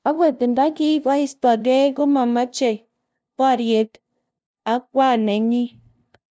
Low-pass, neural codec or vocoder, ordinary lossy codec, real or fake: none; codec, 16 kHz, 0.5 kbps, FunCodec, trained on LibriTTS, 25 frames a second; none; fake